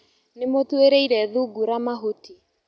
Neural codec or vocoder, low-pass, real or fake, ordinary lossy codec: none; none; real; none